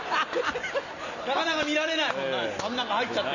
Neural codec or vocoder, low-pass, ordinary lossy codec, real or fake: none; 7.2 kHz; none; real